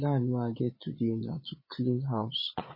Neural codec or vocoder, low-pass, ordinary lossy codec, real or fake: vocoder, 22.05 kHz, 80 mel bands, Vocos; 5.4 kHz; MP3, 24 kbps; fake